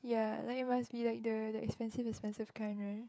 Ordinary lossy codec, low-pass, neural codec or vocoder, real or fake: none; none; codec, 16 kHz, 16 kbps, FreqCodec, smaller model; fake